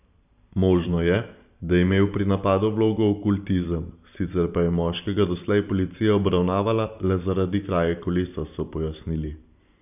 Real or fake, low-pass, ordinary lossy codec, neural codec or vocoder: fake; 3.6 kHz; none; vocoder, 44.1 kHz, 128 mel bands every 512 samples, BigVGAN v2